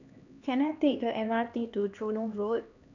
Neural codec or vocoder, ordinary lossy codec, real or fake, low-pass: codec, 16 kHz, 2 kbps, X-Codec, HuBERT features, trained on LibriSpeech; none; fake; 7.2 kHz